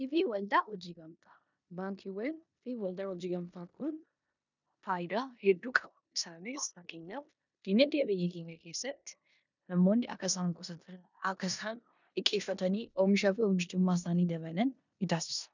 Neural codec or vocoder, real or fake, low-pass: codec, 16 kHz in and 24 kHz out, 0.9 kbps, LongCat-Audio-Codec, four codebook decoder; fake; 7.2 kHz